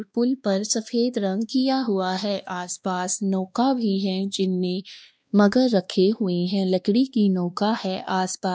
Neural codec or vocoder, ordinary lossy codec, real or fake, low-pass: codec, 16 kHz, 2 kbps, X-Codec, WavLM features, trained on Multilingual LibriSpeech; none; fake; none